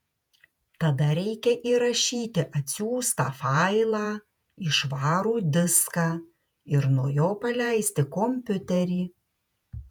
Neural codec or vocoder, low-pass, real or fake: vocoder, 48 kHz, 128 mel bands, Vocos; 19.8 kHz; fake